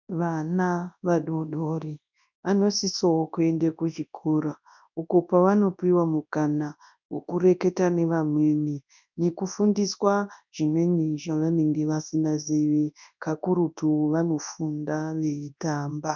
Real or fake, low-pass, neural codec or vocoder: fake; 7.2 kHz; codec, 24 kHz, 0.9 kbps, WavTokenizer, large speech release